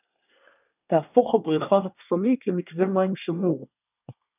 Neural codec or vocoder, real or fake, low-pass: codec, 24 kHz, 1 kbps, SNAC; fake; 3.6 kHz